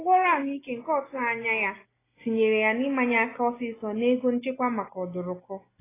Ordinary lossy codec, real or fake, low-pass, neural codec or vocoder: AAC, 16 kbps; real; 3.6 kHz; none